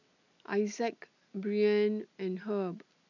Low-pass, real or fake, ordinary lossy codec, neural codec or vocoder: 7.2 kHz; real; none; none